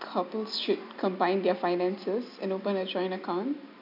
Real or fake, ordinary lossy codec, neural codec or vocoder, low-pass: real; none; none; 5.4 kHz